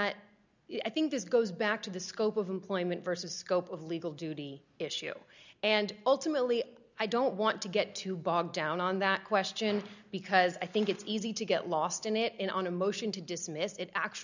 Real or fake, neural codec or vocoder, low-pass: real; none; 7.2 kHz